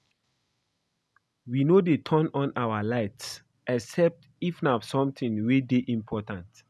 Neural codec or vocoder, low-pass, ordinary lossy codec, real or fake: none; none; none; real